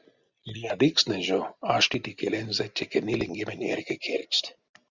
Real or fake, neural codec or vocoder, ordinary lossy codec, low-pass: real; none; Opus, 64 kbps; 7.2 kHz